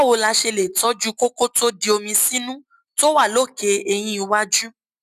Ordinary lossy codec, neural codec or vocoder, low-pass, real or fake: none; none; 14.4 kHz; real